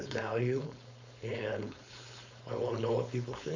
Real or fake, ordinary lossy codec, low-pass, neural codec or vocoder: fake; MP3, 64 kbps; 7.2 kHz; codec, 16 kHz, 4.8 kbps, FACodec